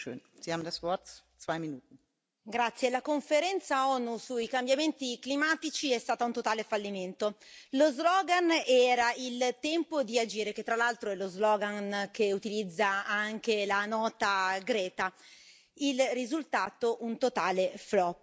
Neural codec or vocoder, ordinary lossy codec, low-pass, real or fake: none; none; none; real